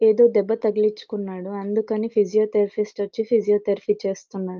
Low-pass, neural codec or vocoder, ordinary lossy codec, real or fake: 7.2 kHz; none; Opus, 24 kbps; real